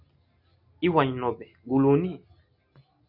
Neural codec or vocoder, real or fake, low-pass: none; real; 5.4 kHz